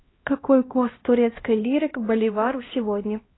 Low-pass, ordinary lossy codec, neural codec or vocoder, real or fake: 7.2 kHz; AAC, 16 kbps; codec, 16 kHz, 1 kbps, X-Codec, HuBERT features, trained on LibriSpeech; fake